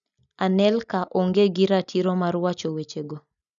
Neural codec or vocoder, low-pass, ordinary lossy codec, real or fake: none; 7.2 kHz; none; real